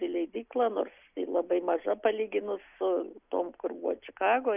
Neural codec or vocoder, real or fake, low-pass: none; real; 3.6 kHz